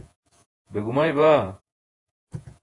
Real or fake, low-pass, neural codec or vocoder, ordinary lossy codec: fake; 10.8 kHz; vocoder, 48 kHz, 128 mel bands, Vocos; AAC, 32 kbps